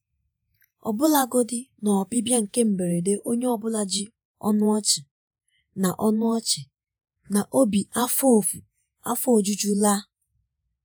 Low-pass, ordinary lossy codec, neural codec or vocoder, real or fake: none; none; vocoder, 48 kHz, 128 mel bands, Vocos; fake